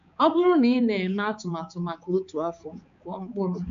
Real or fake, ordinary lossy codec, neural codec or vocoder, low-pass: fake; AAC, 64 kbps; codec, 16 kHz, 4 kbps, X-Codec, HuBERT features, trained on balanced general audio; 7.2 kHz